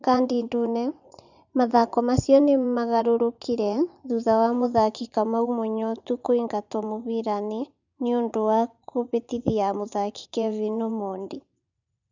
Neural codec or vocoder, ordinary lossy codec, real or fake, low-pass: vocoder, 24 kHz, 100 mel bands, Vocos; none; fake; 7.2 kHz